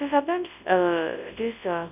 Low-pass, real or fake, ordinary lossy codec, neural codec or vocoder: 3.6 kHz; fake; none; codec, 24 kHz, 0.9 kbps, WavTokenizer, large speech release